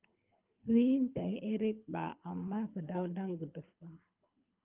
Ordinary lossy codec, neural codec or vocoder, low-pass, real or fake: Opus, 32 kbps; codec, 24 kHz, 6 kbps, HILCodec; 3.6 kHz; fake